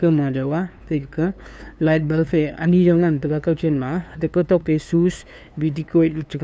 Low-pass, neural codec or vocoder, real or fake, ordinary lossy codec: none; codec, 16 kHz, 2 kbps, FunCodec, trained on LibriTTS, 25 frames a second; fake; none